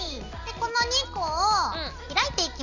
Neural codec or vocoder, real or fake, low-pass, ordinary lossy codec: none; real; 7.2 kHz; none